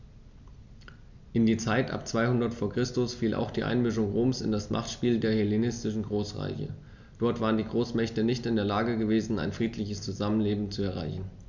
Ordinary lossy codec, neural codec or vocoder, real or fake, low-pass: none; none; real; 7.2 kHz